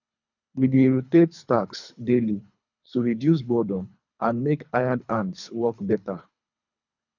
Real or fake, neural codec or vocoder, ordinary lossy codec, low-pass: fake; codec, 24 kHz, 3 kbps, HILCodec; none; 7.2 kHz